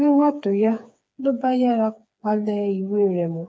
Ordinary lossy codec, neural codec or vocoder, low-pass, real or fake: none; codec, 16 kHz, 4 kbps, FreqCodec, smaller model; none; fake